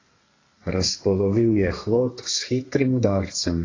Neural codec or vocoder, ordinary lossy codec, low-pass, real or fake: codec, 32 kHz, 1.9 kbps, SNAC; AAC, 32 kbps; 7.2 kHz; fake